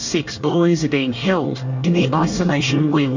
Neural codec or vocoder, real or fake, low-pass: codec, 24 kHz, 1 kbps, SNAC; fake; 7.2 kHz